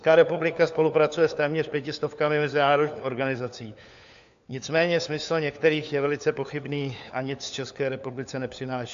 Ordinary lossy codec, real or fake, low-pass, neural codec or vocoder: AAC, 64 kbps; fake; 7.2 kHz; codec, 16 kHz, 4 kbps, FunCodec, trained on LibriTTS, 50 frames a second